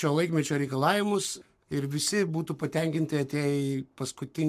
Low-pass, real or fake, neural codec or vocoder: 14.4 kHz; fake; codec, 44.1 kHz, 7.8 kbps, Pupu-Codec